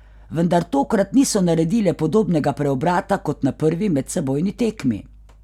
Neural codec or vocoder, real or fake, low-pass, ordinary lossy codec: none; real; 19.8 kHz; none